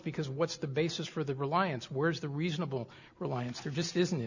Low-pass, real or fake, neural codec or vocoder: 7.2 kHz; real; none